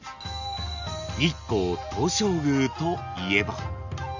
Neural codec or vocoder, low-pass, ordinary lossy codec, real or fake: none; 7.2 kHz; none; real